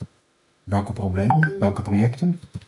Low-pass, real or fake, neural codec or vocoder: 10.8 kHz; fake; autoencoder, 48 kHz, 32 numbers a frame, DAC-VAE, trained on Japanese speech